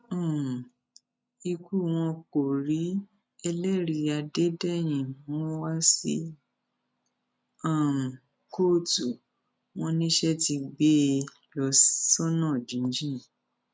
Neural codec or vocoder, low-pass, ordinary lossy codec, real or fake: none; none; none; real